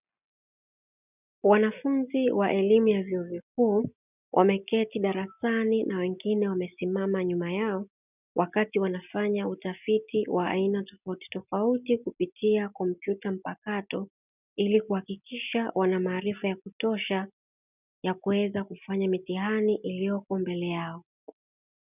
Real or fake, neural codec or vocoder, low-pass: real; none; 3.6 kHz